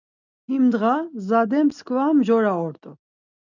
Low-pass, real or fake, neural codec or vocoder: 7.2 kHz; real; none